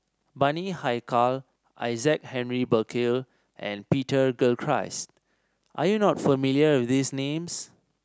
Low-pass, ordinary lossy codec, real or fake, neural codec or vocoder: none; none; real; none